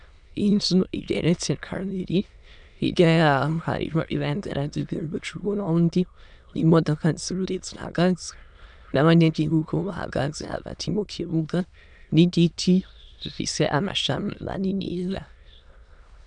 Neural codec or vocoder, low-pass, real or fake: autoencoder, 22.05 kHz, a latent of 192 numbers a frame, VITS, trained on many speakers; 9.9 kHz; fake